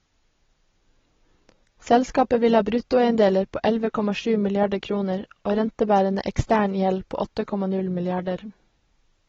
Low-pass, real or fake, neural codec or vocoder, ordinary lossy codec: 7.2 kHz; real; none; AAC, 24 kbps